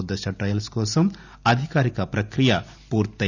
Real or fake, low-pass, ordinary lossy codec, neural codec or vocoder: real; 7.2 kHz; none; none